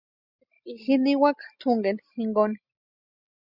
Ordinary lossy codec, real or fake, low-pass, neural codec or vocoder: Opus, 64 kbps; real; 5.4 kHz; none